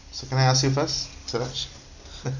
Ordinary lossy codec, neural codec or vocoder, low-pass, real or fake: none; none; 7.2 kHz; real